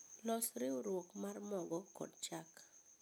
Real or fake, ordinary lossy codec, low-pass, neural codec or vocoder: real; none; none; none